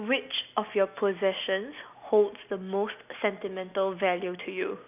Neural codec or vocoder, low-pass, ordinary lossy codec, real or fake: none; 3.6 kHz; none; real